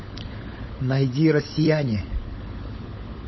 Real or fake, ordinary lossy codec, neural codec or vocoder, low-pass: fake; MP3, 24 kbps; codec, 16 kHz, 16 kbps, FunCodec, trained on LibriTTS, 50 frames a second; 7.2 kHz